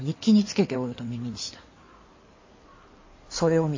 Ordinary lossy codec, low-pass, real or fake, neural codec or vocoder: MP3, 32 kbps; 7.2 kHz; fake; codec, 16 kHz in and 24 kHz out, 2.2 kbps, FireRedTTS-2 codec